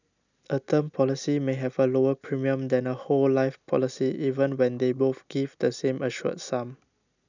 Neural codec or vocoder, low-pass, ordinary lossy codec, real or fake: none; 7.2 kHz; none; real